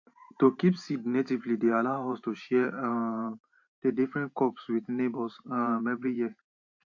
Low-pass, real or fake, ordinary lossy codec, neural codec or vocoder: 7.2 kHz; fake; AAC, 48 kbps; vocoder, 44.1 kHz, 128 mel bands every 512 samples, BigVGAN v2